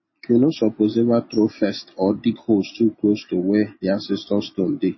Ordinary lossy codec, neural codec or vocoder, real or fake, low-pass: MP3, 24 kbps; none; real; 7.2 kHz